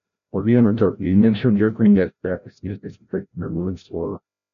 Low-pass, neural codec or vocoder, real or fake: 7.2 kHz; codec, 16 kHz, 0.5 kbps, FreqCodec, larger model; fake